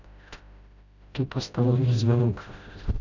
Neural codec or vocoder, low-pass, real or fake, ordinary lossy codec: codec, 16 kHz, 0.5 kbps, FreqCodec, smaller model; 7.2 kHz; fake; none